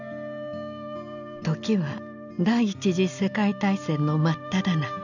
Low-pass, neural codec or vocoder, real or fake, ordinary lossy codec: 7.2 kHz; none; real; none